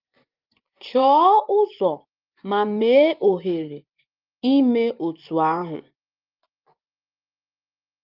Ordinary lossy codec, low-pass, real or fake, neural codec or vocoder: Opus, 24 kbps; 5.4 kHz; fake; vocoder, 24 kHz, 100 mel bands, Vocos